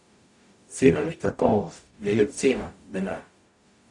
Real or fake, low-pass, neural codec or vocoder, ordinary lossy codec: fake; 10.8 kHz; codec, 44.1 kHz, 0.9 kbps, DAC; none